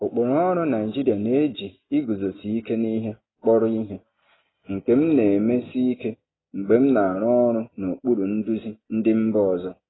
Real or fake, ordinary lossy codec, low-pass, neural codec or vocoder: real; AAC, 16 kbps; 7.2 kHz; none